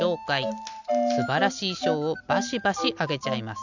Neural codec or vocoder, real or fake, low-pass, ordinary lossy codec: none; real; 7.2 kHz; none